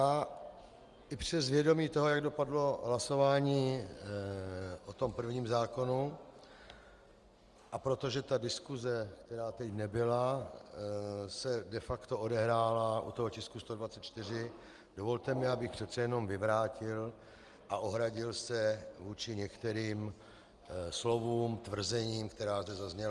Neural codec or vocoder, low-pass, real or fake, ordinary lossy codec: none; 10.8 kHz; real; Opus, 32 kbps